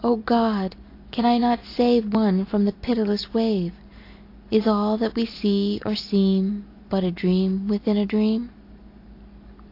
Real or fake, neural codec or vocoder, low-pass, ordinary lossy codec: real; none; 5.4 kHz; AAC, 32 kbps